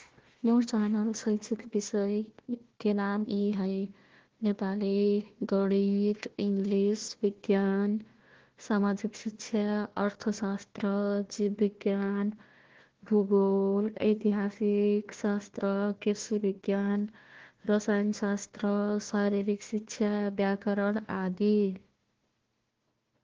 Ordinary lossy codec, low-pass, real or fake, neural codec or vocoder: Opus, 16 kbps; 7.2 kHz; fake; codec, 16 kHz, 1 kbps, FunCodec, trained on Chinese and English, 50 frames a second